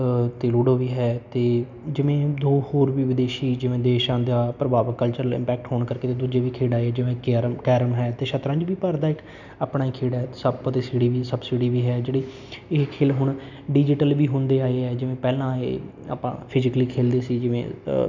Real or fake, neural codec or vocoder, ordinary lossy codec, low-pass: real; none; none; 7.2 kHz